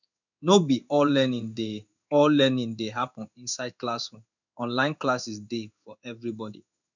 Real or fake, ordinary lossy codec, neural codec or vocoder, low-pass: fake; none; codec, 16 kHz in and 24 kHz out, 1 kbps, XY-Tokenizer; 7.2 kHz